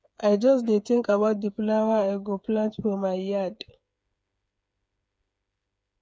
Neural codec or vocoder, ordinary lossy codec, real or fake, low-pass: codec, 16 kHz, 8 kbps, FreqCodec, smaller model; none; fake; none